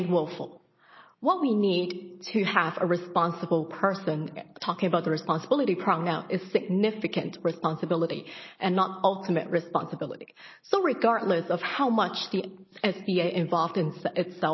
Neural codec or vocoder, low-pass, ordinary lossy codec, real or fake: none; 7.2 kHz; MP3, 24 kbps; real